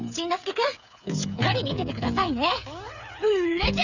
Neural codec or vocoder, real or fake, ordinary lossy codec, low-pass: codec, 16 kHz, 8 kbps, FreqCodec, smaller model; fake; none; 7.2 kHz